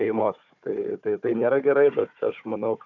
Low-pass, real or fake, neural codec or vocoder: 7.2 kHz; fake; codec, 16 kHz, 4 kbps, FunCodec, trained on Chinese and English, 50 frames a second